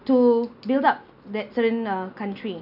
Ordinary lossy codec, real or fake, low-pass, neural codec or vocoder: none; real; 5.4 kHz; none